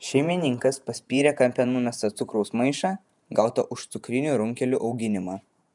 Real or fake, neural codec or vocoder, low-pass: fake; vocoder, 48 kHz, 128 mel bands, Vocos; 10.8 kHz